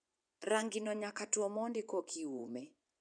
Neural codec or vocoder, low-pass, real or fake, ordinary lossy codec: vocoder, 24 kHz, 100 mel bands, Vocos; 9.9 kHz; fake; none